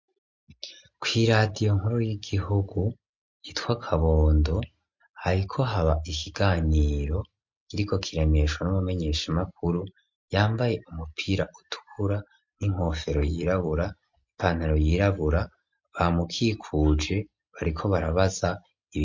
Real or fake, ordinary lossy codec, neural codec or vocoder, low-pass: real; MP3, 48 kbps; none; 7.2 kHz